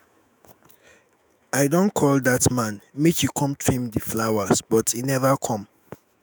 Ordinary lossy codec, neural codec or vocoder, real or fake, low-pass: none; autoencoder, 48 kHz, 128 numbers a frame, DAC-VAE, trained on Japanese speech; fake; none